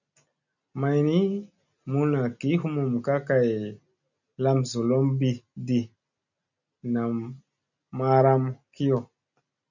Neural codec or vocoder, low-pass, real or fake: none; 7.2 kHz; real